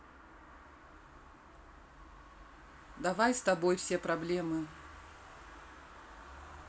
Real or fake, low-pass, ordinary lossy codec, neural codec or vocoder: real; none; none; none